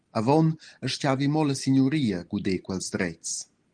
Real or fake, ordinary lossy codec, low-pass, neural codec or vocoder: real; Opus, 24 kbps; 9.9 kHz; none